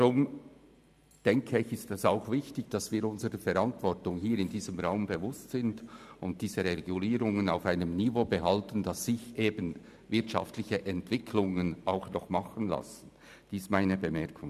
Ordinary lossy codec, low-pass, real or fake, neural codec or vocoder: none; 14.4 kHz; fake; vocoder, 44.1 kHz, 128 mel bands every 512 samples, BigVGAN v2